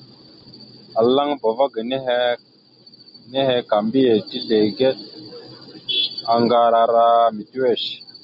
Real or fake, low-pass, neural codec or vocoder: real; 5.4 kHz; none